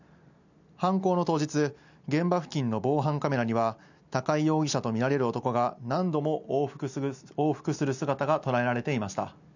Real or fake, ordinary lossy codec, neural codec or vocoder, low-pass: real; none; none; 7.2 kHz